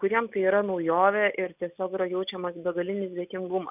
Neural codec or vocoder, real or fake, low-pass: none; real; 3.6 kHz